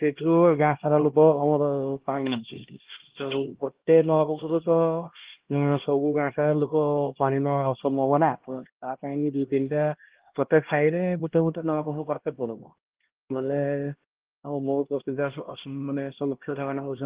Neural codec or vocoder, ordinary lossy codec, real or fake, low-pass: codec, 16 kHz, 1 kbps, X-Codec, HuBERT features, trained on LibriSpeech; Opus, 16 kbps; fake; 3.6 kHz